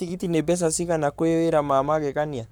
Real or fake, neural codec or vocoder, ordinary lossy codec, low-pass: fake; codec, 44.1 kHz, 7.8 kbps, Pupu-Codec; none; none